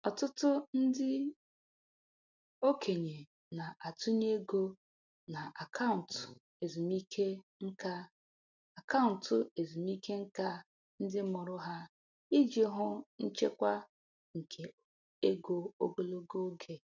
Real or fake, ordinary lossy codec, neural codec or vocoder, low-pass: real; none; none; 7.2 kHz